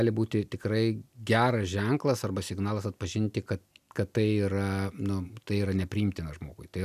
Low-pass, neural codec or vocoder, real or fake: 14.4 kHz; none; real